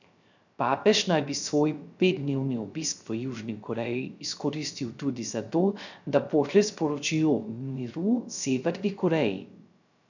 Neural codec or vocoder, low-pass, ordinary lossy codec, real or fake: codec, 16 kHz, 0.3 kbps, FocalCodec; 7.2 kHz; none; fake